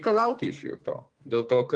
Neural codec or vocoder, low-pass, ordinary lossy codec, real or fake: codec, 32 kHz, 1.9 kbps, SNAC; 9.9 kHz; Opus, 24 kbps; fake